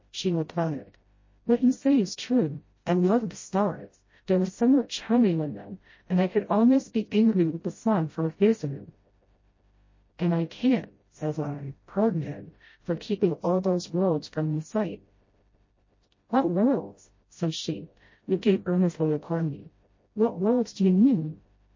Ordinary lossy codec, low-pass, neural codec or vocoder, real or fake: MP3, 32 kbps; 7.2 kHz; codec, 16 kHz, 0.5 kbps, FreqCodec, smaller model; fake